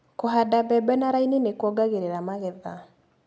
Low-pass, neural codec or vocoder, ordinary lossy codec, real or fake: none; none; none; real